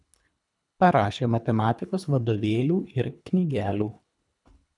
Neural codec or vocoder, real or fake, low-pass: codec, 24 kHz, 3 kbps, HILCodec; fake; 10.8 kHz